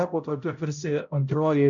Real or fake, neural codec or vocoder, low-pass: fake; codec, 16 kHz, 0.5 kbps, X-Codec, HuBERT features, trained on balanced general audio; 7.2 kHz